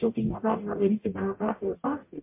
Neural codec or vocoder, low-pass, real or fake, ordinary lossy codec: codec, 44.1 kHz, 0.9 kbps, DAC; 3.6 kHz; fake; MP3, 24 kbps